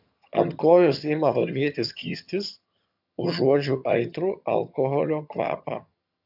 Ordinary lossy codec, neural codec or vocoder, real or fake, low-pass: AAC, 48 kbps; vocoder, 22.05 kHz, 80 mel bands, HiFi-GAN; fake; 5.4 kHz